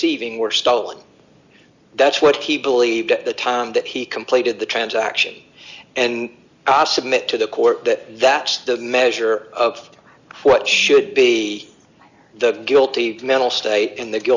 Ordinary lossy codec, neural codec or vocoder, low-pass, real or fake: Opus, 64 kbps; none; 7.2 kHz; real